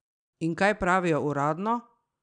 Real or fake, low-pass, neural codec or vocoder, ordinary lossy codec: real; 9.9 kHz; none; none